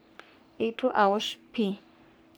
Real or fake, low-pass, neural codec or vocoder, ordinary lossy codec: fake; none; codec, 44.1 kHz, 3.4 kbps, Pupu-Codec; none